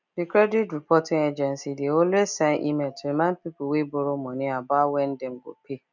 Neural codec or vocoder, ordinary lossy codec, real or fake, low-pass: none; none; real; 7.2 kHz